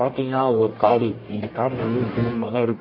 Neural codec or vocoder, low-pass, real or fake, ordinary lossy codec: codec, 44.1 kHz, 1.7 kbps, Pupu-Codec; 5.4 kHz; fake; MP3, 24 kbps